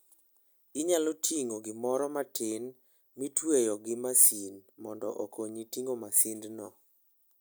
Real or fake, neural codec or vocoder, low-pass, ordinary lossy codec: real; none; none; none